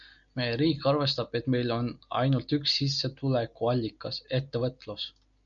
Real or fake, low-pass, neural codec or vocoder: real; 7.2 kHz; none